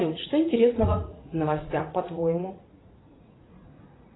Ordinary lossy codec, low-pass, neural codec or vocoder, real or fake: AAC, 16 kbps; 7.2 kHz; vocoder, 44.1 kHz, 80 mel bands, Vocos; fake